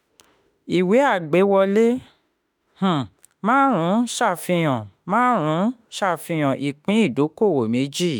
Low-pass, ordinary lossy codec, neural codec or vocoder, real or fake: none; none; autoencoder, 48 kHz, 32 numbers a frame, DAC-VAE, trained on Japanese speech; fake